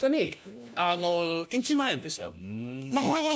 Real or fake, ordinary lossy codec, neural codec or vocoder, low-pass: fake; none; codec, 16 kHz, 1 kbps, FunCodec, trained on LibriTTS, 50 frames a second; none